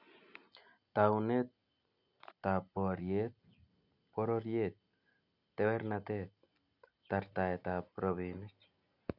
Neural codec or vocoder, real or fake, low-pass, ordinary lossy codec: none; real; 5.4 kHz; none